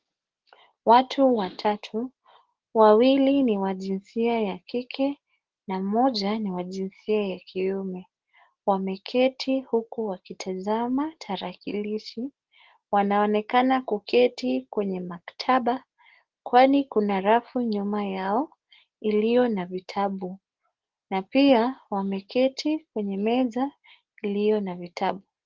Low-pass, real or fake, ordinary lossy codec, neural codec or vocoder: 7.2 kHz; real; Opus, 16 kbps; none